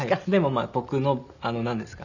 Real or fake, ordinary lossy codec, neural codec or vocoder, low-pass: real; none; none; 7.2 kHz